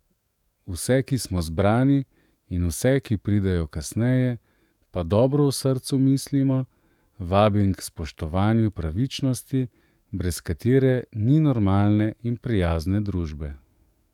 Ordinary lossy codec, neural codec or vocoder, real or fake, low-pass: none; codec, 44.1 kHz, 7.8 kbps, DAC; fake; 19.8 kHz